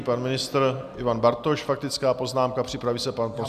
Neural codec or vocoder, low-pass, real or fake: none; 14.4 kHz; real